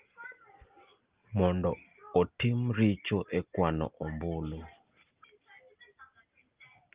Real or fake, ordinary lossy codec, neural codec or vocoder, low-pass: real; Opus, 32 kbps; none; 3.6 kHz